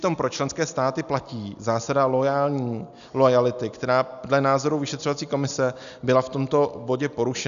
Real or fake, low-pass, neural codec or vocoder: real; 7.2 kHz; none